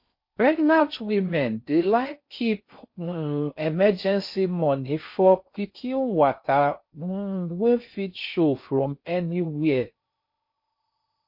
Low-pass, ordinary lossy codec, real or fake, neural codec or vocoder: 5.4 kHz; MP3, 32 kbps; fake; codec, 16 kHz in and 24 kHz out, 0.6 kbps, FocalCodec, streaming, 4096 codes